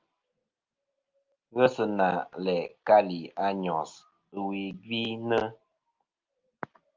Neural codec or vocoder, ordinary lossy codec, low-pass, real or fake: none; Opus, 32 kbps; 7.2 kHz; real